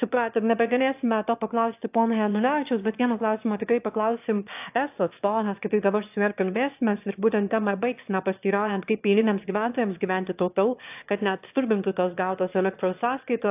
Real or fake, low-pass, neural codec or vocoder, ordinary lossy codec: fake; 3.6 kHz; autoencoder, 22.05 kHz, a latent of 192 numbers a frame, VITS, trained on one speaker; AAC, 32 kbps